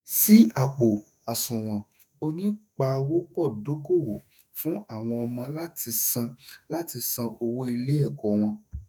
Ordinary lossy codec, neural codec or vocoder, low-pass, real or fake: none; autoencoder, 48 kHz, 32 numbers a frame, DAC-VAE, trained on Japanese speech; none; fake